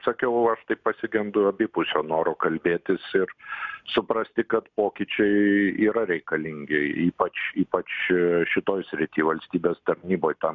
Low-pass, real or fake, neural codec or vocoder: 7.2 kHz; real; none